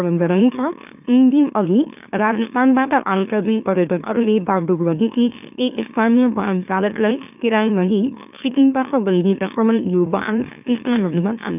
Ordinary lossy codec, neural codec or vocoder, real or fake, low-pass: none; autoencoder, 44.1 kHz, a latent of 192 numbers a frame, MeloTTS; fake; 3.6 kHz